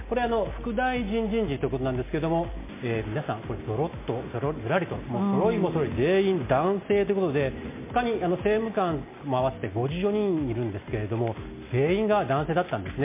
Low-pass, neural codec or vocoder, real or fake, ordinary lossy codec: 3.6 kHz; none; real; MP3, 24 kbps